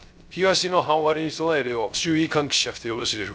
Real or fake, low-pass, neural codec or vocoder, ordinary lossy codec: fake; none; codec, 16 kHz, 0.3 kbps, FocalCodec; none